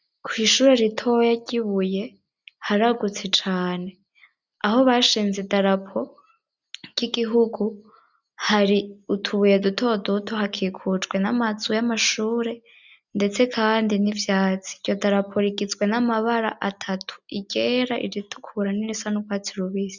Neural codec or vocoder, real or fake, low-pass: none; real; 7.2 kHz